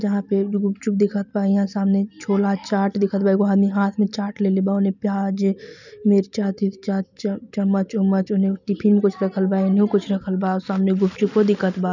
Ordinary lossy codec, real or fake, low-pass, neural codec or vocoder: none; real; 7.2 kHz; none